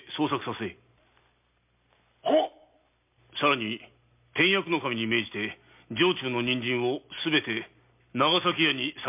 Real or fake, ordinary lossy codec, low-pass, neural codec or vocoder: real; MP3, 32 kbps; 3.6 kHz; none